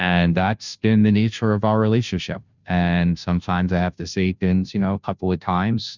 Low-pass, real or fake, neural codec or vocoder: 7.2 kHz; fake; codec, 16 kHz, 0.5 kbps, FunCodec, trained on Chinese and English, 25 frames a second